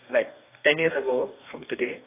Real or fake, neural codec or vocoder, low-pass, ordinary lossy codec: fake; codec, 44.1 kHz, 2.6 kbps, DAC; 3.6 kHz; AAC, 24 kbps